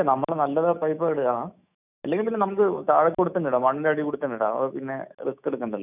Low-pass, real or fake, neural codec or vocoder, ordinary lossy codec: 3.6 kHz; fake; autoencoder, 48 kHz, 128 numbers a frame, DAC-VAE, trained on Japanese speech; none